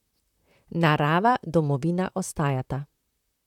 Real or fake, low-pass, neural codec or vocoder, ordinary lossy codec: fake; 19.8 kHz; vocoder, 44.1 kHz, 128 mel bands, Pupu-Vocoder; none